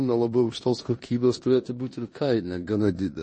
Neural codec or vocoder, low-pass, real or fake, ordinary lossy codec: codec, 16 kHz in and 24 kHz out, 0.9 kbps, LongCat-Audio-Codec, four codebook decoder; 10.8 kHz; fake; MP3, 32 kbps